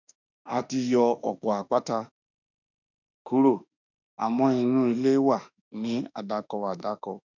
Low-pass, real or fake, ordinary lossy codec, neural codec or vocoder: 7.2 kHz; fake; none; autoencoder, 48 kHz, 32 numbers a frame, DAC-VAE, trained on Japanese speech